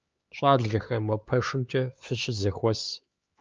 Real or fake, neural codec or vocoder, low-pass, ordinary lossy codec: fake; codec, 16 kHz, 4 kbps, X-Codec, HuBERT features, trained on LibriSpeech; 7.2 kHz; Opus, 24 kbps